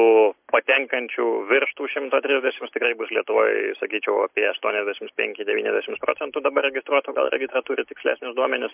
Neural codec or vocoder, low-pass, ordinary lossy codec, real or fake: none; 3.6 kHz; MP3, 32 kbps; real